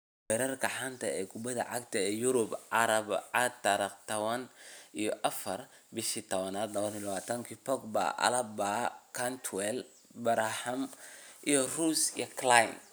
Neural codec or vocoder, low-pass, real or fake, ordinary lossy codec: none; none; real; none